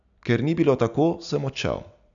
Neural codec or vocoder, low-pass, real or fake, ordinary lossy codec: none; 7.2 kHz; real; none